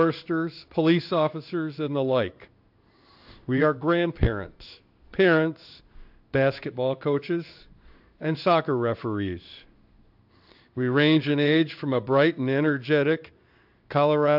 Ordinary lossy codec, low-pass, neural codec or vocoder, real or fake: AAC, 48 kbps; 5.4 kHz; codec, 16 kHz in and 24 kHz out, 1 kbps, XY-Tokenizer; fake